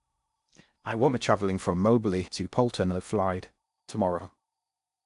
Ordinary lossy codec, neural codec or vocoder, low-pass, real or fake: AAC, 96 kbps; codec, 16 kHz in and 24 kHz out, 0.6 kbps, FocalCodec, streaming, 4096 codes; 10.8 kHz; fake